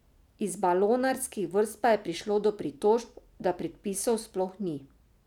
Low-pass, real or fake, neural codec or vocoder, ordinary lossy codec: 19.8 kHz; real; none; none